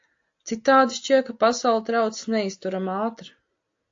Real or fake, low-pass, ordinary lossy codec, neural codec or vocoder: real; 7.2 kHz; AAC, 48 kbps; none